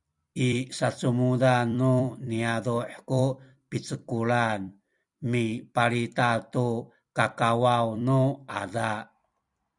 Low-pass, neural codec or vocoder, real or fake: 10.8 kHz; vocoder, 44.1 kHz, 128 mel bands every 256 samples, BigVGAN v2; fake